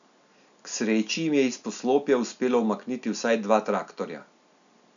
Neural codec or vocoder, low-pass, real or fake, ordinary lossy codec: none; 7.2 kHz; real; none